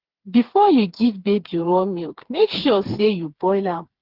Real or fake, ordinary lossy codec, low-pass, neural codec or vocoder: fake; Opus, 16 kbps; 5.4 kHz; codec, 16 kHz, 4 kbps, FreqCodec, smaller model